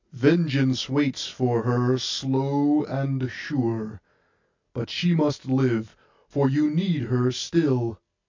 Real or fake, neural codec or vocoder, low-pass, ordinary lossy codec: real; none; 7.2 kHz; MP3, 48 kbps